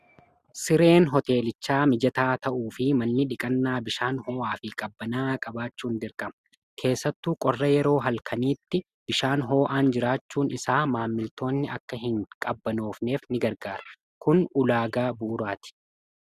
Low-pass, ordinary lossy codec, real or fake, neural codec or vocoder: 14.4 kHz; Opus, 64 kbps; real; none